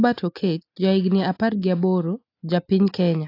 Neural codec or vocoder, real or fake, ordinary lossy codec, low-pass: none; real; AAC, 32 kbps; 5.4 kHz